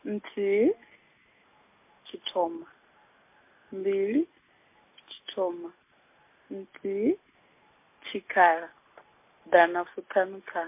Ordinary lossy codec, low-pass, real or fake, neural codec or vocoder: MP3, 24 kbps; 3.6 kHz; real; none